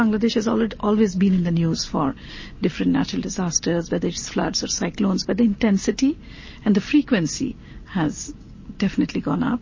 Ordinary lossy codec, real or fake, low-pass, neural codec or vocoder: MP3, 32 kbps; real; 7.2 kHz; none